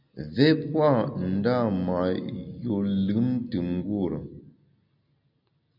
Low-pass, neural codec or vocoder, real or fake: 5.4 kHz; none; real